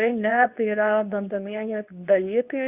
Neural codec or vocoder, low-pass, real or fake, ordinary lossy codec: codec, 24 kHz, 0.9 kbps, WavTokenizer, medium speech release version 2; 3.6 kHz; fake; Opus, 64 kbps